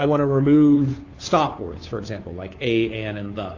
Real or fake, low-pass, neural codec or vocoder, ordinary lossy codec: fake; 7.2 kHz; codec, 16 kHz, 2 kbps, FunCodec, trained on Chinese and English, 25 frames a second; AAC, 32 kbps